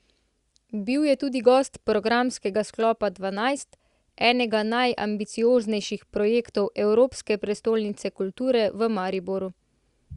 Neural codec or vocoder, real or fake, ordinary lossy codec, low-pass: none; real; Opus, 64 kbps; 10.8 kHz